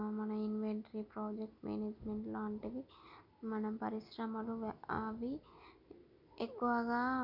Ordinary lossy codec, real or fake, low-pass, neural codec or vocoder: none; real; 5.4 kHz; none